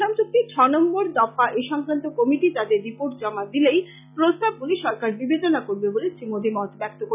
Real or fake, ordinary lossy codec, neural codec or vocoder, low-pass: real; none; none; 3.6 kHz